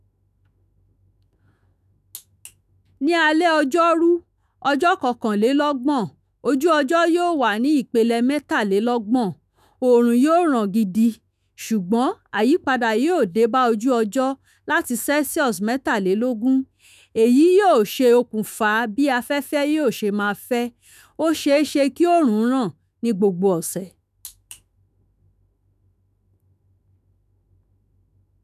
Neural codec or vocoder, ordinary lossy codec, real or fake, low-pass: autoencoder, 48 kHz, 128 numbers a frame, DAC-VAE, trained on Japanese speech; none; fake; 14.4 kHz